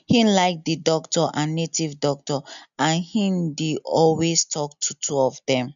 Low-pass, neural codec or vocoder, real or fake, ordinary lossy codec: 7.2 kHz; none; real; none